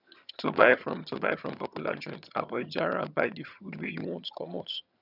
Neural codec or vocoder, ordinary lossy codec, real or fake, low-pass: vocoder, 22.05 kHz, 80 mel bands, HiFi-GAN; none; fake; 5.4 kHz